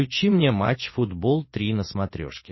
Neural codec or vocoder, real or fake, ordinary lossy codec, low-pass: none; real; MP3, 24 kbps; 7.2 kHz